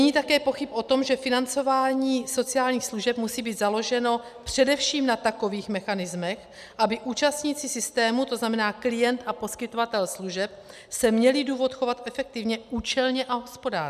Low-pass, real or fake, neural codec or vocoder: 14.4 kHz; real; none